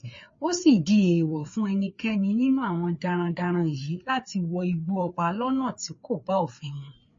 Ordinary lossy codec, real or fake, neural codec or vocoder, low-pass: MP3, 32 kbps; fake; codec, 16 kHz, 4 kbps, FreqCodec, larger model; 7.2 kHz